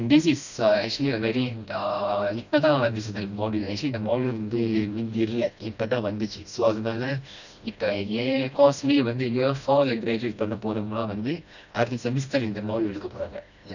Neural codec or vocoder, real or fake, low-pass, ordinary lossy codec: codec, 16 kHz, 1 kbps, FreqCodec, smaller model; fake; 7.2 kHz; none